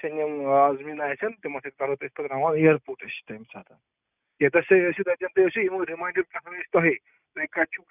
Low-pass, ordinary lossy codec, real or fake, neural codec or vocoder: 3.6 kHz; none; real; none